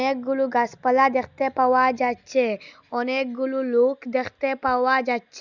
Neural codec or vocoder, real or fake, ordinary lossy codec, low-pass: none; real; Opus, 64 kbps; 7.2 kHz